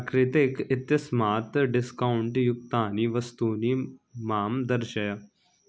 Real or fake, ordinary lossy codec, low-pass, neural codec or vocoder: real; none; none; none